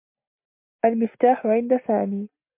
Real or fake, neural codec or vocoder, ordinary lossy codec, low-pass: real; none; MP3, 32 kbps; 3.6 kHz